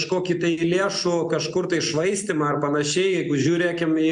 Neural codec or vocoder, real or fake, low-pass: none; real; 9.9 kHz